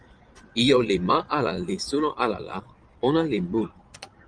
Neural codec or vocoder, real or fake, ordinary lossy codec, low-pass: vocoder, 22.05 kHz, 80 mel bands, Vocos; fake; Opus, 32 kbps; 9.9 kHz